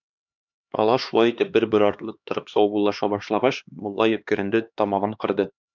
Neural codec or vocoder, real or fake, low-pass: codec, 16 kHz, 2 kbps, X-Codec, HuBERT features, trained on LibriSpeech; fake; 7.2 kHz